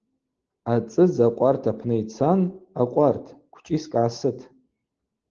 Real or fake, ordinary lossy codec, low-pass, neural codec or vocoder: real; Opus, 16 kbps; 7.2 kHz; none